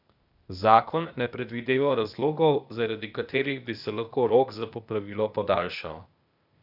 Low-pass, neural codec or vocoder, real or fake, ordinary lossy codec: 5.4 kHz; codec, 16 kHz, 0.8 kbps, ZipCodec; fake; none